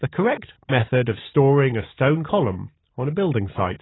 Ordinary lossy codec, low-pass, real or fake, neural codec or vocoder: AAC, 16 kbps; 7.2 kHz; real; none